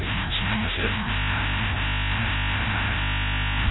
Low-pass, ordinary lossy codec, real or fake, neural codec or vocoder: 7.2 kHz; AAC, 16 kbps; fake; codec, 16 kHz, 0.5 kbps, FreqCodec, larger model